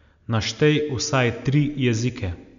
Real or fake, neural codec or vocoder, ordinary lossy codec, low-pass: real; none; MP3, 96 kbps; 7.2 kHz